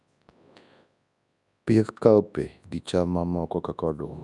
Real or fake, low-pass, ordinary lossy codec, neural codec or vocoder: fake; 10.8 kHz; none; codec, 24 kHz, 0.9 kbps, WavTokenizer, large speech release